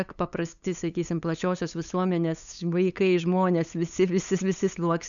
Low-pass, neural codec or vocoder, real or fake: 7.2 kHz; codec, 16 kHz, 8 kbps, FunCodec, trained on LibriTTS, 25 frames a second; fake